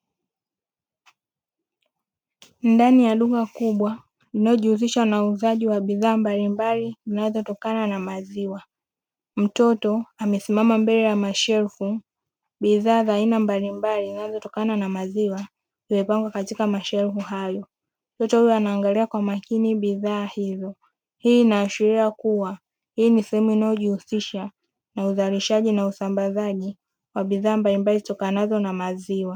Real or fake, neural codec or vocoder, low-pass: real; none; 19.8 kHz